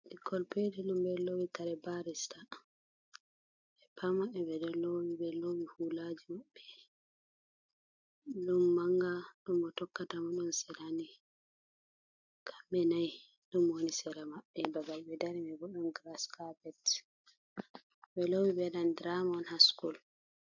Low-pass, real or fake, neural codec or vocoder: 7.2 kHz; real; none